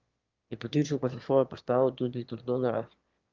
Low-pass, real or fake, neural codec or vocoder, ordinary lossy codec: 7.2 kHz; fake; autoencoder, 22.05 kHz, a latent of 192 numbers a frame, VITS, trained on one speaker; Opus, 32 kbps